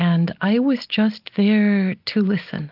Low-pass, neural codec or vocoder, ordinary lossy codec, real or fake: 5.4 kHz; none; Opus, 32 kbps; real